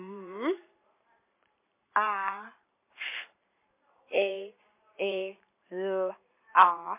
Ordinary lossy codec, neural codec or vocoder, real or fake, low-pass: MP3, 24 kbps; vocoder, 44.1 kHz, 128 mel bands every 512 samples, BigVGAN v2; fake; 3.6 kHz